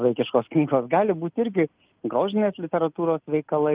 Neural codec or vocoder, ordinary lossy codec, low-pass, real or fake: none; Opus, 32 kbps; 3.6 kHz; real